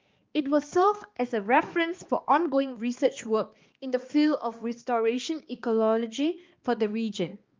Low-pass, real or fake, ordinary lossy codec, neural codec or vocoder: 7.2 kHz; fake; Opus, 32 kbps; codec, 16 kHz, 2 kbps, X-Codec, HuBERT features, trained on balanced general audio